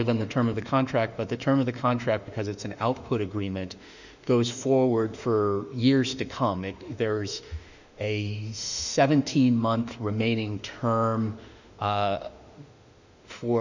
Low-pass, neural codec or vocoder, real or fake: 7.2 kHz; autoencoder, 48 kHz, 32 numbers a frame, DAC-VAE, trained on Japanese speech; fake